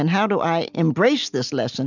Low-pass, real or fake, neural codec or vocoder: 7.2 kHz; real; none